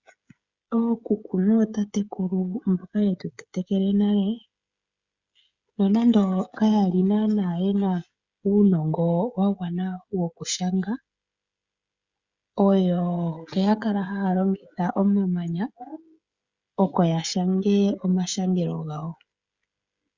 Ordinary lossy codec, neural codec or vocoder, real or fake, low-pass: Opus, 64 kbps; codec, 16 kHz, 16 kbps, FreqCodec, smaller model; fake; 7.2 kHz